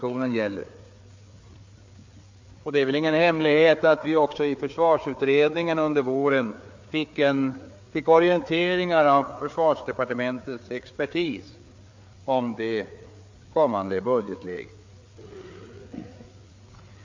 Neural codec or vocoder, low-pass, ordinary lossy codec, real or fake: codec, 16 kHz, 4 kbps, FreqCodec, larger model; 7.2 kHz; MP3, 48 kbps; fake